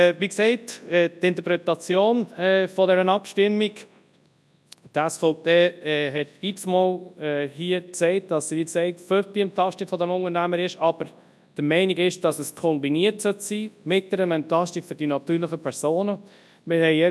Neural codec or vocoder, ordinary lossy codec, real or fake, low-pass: codec, 24 kHz, 0.9 kbps, WavTokenizer, large speech release; none; fake; none